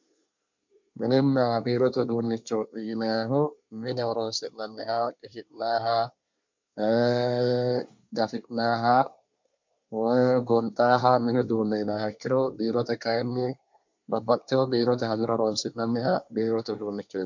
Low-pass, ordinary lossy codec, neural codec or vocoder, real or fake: 7.2 kHz; MP3, 64 kbps; codec, 24 kHz, 1 kbps, SNAC; fake